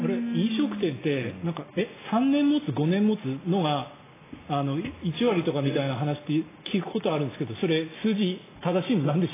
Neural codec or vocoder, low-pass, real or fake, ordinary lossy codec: none; 3.6 kHz; real; AAC, 16 kbps